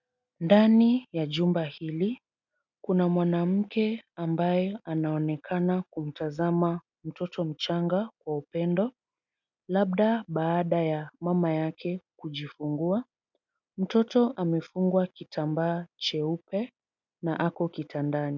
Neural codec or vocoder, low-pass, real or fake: none; 7.2 kHz; real